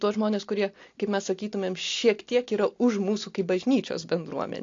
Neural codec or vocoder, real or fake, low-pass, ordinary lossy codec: none; real; 7.2 kHz; AAC, 48 kbps